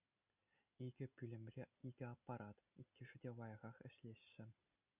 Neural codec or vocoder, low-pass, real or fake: none; 3.6 kHz; real